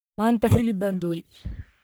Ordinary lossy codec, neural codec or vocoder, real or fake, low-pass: none; codec, 44.1 kHz, 1.7 kbps, Pupu-Codec; fake; none